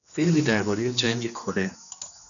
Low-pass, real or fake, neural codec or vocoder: 7.2 kHz; fake; codec, 16 kHz, 2 kbps, X-Codec, HuBERT features, trained on general audio